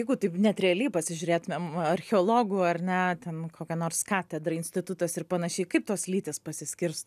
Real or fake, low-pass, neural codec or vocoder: real; 14.4 kHz; none